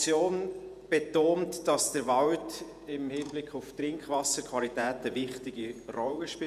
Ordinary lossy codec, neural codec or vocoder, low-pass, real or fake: none; vocoder, 48 kHz, 128 mel bands, Vocos; 14.4 kHz; fake